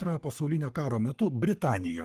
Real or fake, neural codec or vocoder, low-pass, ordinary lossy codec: fake; codec, 44.1 kHz, 3.4 kbps, Pupu-Codec; 14.4 kHz; Opus, 24 kbps